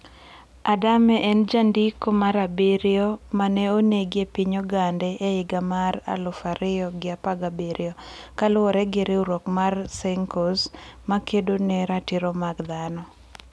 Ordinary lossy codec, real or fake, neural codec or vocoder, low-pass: none; real; none; none